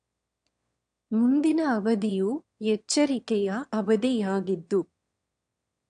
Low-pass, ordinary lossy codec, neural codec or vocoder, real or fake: 9.9 kHz; AAC, 64 kbps; autoencoder, 22.05 kHz, a latent of 192 numbers a frame, VITS, trained on one speaker; fake